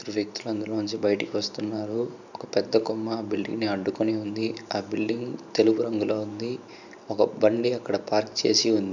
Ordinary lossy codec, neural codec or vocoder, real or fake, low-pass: none; vocoder, 44.1 kHz, 128 mel bands every 512 samples, BigVGAN v2; fake; 7.2 kHz